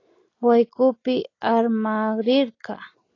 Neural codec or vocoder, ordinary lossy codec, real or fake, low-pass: none; AAC, 32 kbps; real; 7.2 kHz